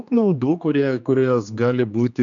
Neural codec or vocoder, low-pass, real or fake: codec, 16 kHz, 2 kbps, X-Codec, HuBERT features, trained on general audio; 7.2 kHz; fake